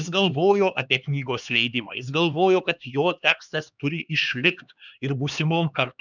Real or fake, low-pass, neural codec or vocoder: fake; 7.2 kHz; codec, 16 kHz, 2 kbps, X-Codec, HuBERT features, trained on LibriSpeech